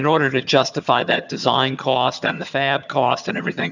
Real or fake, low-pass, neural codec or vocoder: fake; 7.2 kHz; vocoder, 22.05 kHz, 80 mel bands, HiFi-GAN